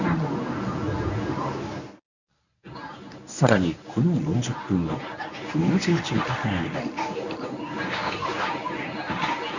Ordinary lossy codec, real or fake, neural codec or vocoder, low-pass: none; fake; codec, 24 kHz, 0.9 kbps, WavTokenizer, medium speech release version 1; 7.2 kHz